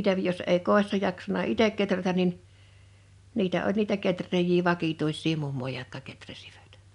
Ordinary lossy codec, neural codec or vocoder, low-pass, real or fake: none; none; 10.8 kHz; real